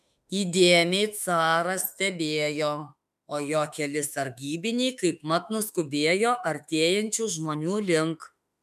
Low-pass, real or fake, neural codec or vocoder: 14.4 kHz; fake; autoencoder, 48 kHz, 32 numbers a frame, DAC-VAE, trained on Japanese speech